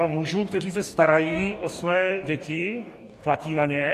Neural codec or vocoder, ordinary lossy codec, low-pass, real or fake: codec, 44.1 kHz, 2.6 kbps, DAC; AAC, 64 kbps; 14.4 kHz; fake